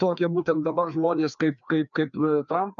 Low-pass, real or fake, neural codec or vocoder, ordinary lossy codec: 7.2 kHz; fake; codec, 16 kHz, 2 kbps, FreqCodec, larger model; MP3, 96 kbps